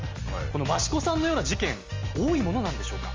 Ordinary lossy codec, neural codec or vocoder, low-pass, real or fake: Opus, 32 kbps; none; 7.2 kHz; real